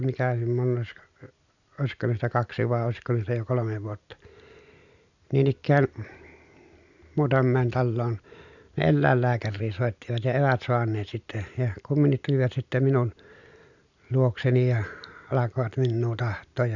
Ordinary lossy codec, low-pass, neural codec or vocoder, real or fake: none; 7.2 kHz; none; real